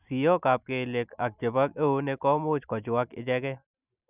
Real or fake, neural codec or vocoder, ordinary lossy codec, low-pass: real; none; none; 3.6 kHz